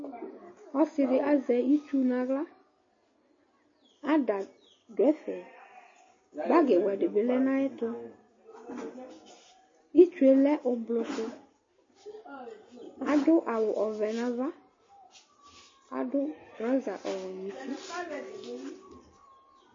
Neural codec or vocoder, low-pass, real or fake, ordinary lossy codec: none; 7.2 kHz; real; MP3, 32 kbps